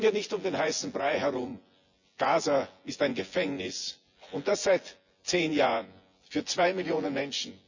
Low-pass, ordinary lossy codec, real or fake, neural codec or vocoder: 7.2 kHz; Opus, 64 kbps; fake; vocoder, 24 kHz, 100 mel bands, Vocos